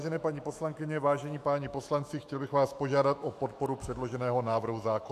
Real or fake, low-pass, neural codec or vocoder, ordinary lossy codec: fake; 14.4 kHz; autoencoder, 48 kHz, 128 numbers a frame, DAC-VAE, trained on Japanese speech; AAC, 96 kbps